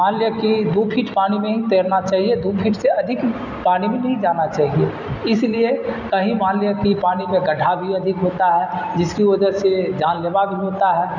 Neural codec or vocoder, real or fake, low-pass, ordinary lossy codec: none; real; 7.2 kHz; none